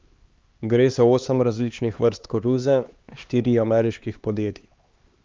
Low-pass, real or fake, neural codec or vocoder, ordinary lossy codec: 7.2 kHz; fake; codec, 16 kHz, 2 kbps, X-Codec, HuBERT features, trained on LibriSpeech; Opus, 24 kbps